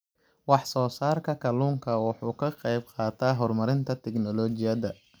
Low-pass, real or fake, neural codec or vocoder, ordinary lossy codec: none; real; none; none